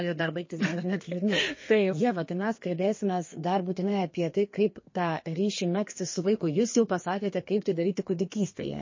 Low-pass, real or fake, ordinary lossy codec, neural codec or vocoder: 7.2 kHz; fake; MP3, 32 kbps; codec, 32 kHz, 1.9 kbps, SNAC